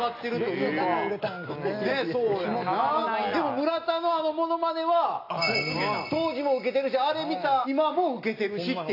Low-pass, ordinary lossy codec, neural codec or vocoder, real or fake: 5.4 kHz; AAC, 32 kbps; none; real